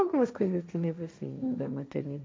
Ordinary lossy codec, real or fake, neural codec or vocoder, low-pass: none; fake; codec, 16 kHz, 1.1 kbps, Voila-Tokenizer; none